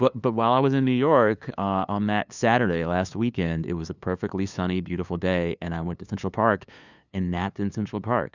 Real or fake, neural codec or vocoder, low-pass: fake; codec, 16 kHz, 2 kbps, FunCodec, trained on LibriTTS, 25 frames a second; 7.2 kHz